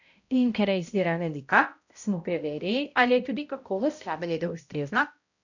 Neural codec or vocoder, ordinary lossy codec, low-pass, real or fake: codec, 16 kHz, 0.5 kbps, X-Codec, HuBERT features, trained on balanced general audio; none; 7.2 kHz; fake